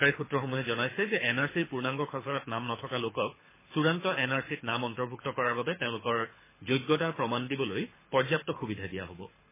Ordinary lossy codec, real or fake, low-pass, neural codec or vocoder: MP3, 16 kbps; fake; 3.6 kHz; codec, 24 kHz, 6 kbps, HILCodec